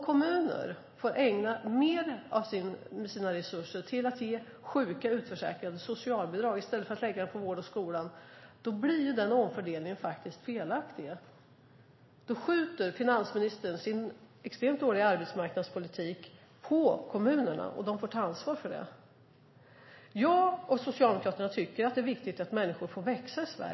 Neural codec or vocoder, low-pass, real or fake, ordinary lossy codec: none; 7.2 kHz; real; MP3, 24 kbps